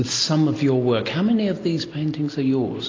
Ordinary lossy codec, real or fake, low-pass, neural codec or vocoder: AAC, 32 kbps; real; 7.2 kHz; none